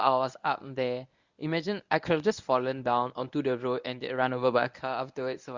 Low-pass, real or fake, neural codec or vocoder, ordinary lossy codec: 7.2 kHz; fake; codec, 24 kHz, 0.9 kbps, WavTokenizer, medium speech release version 1; Opus, 64 kbps